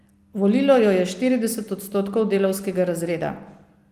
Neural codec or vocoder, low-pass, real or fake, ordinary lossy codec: none; 14.4 kHz; real; Opus, 24 kbps